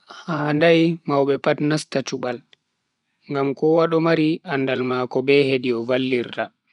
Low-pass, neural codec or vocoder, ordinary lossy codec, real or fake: 10.8 kHz; vocoder, 24 kHz, 100 mel bands, Vocos; none; fake